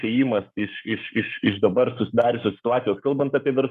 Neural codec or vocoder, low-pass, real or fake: codec, 44.1 kHz, 7.8 kbps, Pupu-Codec; 14.4 kHz; fake